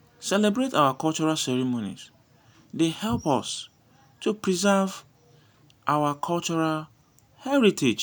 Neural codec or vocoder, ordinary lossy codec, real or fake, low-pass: none; none; real; none